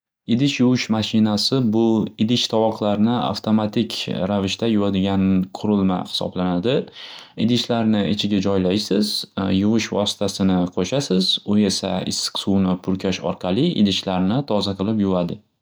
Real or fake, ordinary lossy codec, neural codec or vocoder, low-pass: real; none; none; none